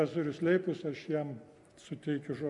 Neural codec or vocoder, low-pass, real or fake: none; 10.8 kHz; real